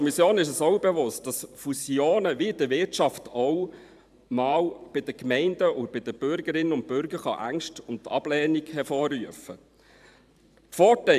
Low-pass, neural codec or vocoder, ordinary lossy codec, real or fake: 14.4 kHz; vocoder, 44.1 kHz, 128 mel bands every 512 samples, BigVGAN v2; AAC, 96 kbps; fake